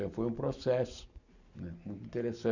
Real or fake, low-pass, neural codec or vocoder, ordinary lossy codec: real; 7.2 kHz; none; none